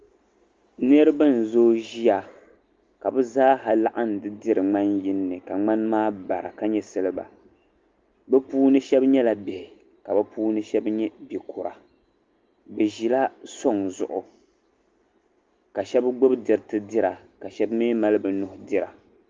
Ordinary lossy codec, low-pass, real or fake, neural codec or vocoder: Opus, 32 kbps; 7.2 kHz; real; none